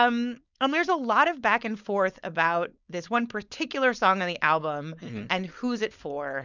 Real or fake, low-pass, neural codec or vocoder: fake; 7.2 kHz; codec, 16 kHz, 4.8 kbps, FACodec